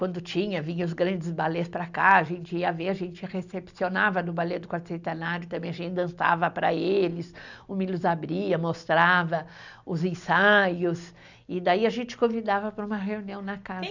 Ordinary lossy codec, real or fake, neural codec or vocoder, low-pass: none; real; none; 7.2 kHz